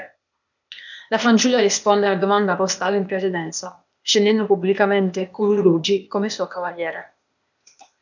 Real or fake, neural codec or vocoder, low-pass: fake; codec, 16 kHz, 0.8 kbps, ZipCodec; 7.2 kHz